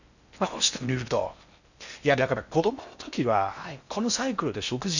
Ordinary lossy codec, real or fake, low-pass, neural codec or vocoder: none; fake; 7.2 kHz; codec, 16 kHz in and 24 kHz out, 0.6 kbps, FocalCodec, streaming, 4096 codes